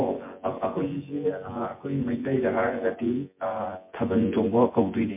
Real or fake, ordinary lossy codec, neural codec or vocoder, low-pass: fake; MP3, 24 kbps; vocoder, 24 kHz, 100 mel bands, Vocos; 3.6 kHz